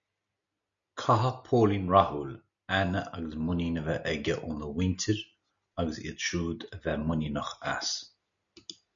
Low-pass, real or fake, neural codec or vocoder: 7.2 kHz; real; none